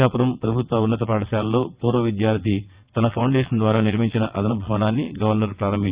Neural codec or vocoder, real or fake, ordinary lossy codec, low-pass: vocoder, 22.05 kHz, 80 mel bands, WaveNeXt; fake; Opus, 64 kbps; 3.6 kHz